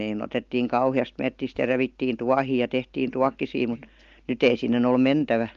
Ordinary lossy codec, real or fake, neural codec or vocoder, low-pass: Opus, 32 kbps; real; none; 7.2 kHz